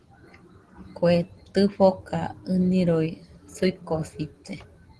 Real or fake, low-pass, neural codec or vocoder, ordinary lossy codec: real; 10.8 kHz; none; Opus, 16 kbps